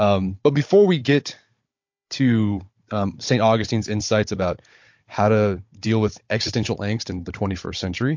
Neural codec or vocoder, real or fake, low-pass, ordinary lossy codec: codec, 16 kHz, 16 kbps, FunCodec, trained on Chinese and English, 50 frames a second; fake; 7.2 kHz; MP3, 48 kbps